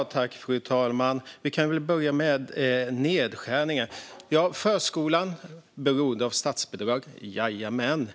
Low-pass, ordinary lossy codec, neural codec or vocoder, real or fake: none; none; none; real